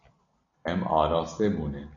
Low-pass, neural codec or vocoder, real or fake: 7.2 kHz; none; real